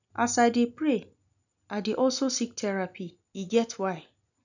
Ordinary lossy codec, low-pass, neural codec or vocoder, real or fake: none; 7.2 kHz; none; real